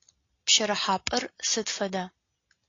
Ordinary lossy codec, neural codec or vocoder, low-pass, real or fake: AAC, 32 kbps; none; 7.2 kHz; real